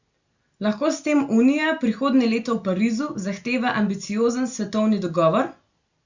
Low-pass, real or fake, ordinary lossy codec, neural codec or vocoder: 7.2 kHz; real; Opus, 64 kbps; none